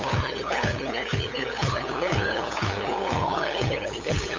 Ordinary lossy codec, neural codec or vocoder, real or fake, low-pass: MP3, 48 kbps; codec, 16 kHz, 8 kbps, FunCodec, trained on LibriTTS, 25 frames a second; fake; 7.2 kHz